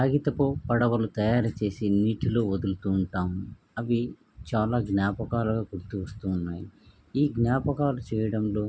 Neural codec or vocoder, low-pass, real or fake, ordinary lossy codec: none; none; real; none